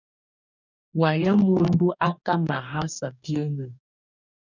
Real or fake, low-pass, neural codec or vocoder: fake; 7.2 kHz; codec, 44.1 kHz, 2.6 kbps, DAC